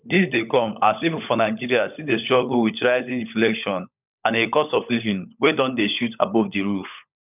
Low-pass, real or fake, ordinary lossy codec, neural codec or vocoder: 3.6 kHz; fake; none; codec, 16 kHz, 16 kbps, FunCodec, trained on LibriTTS, 50 frames a second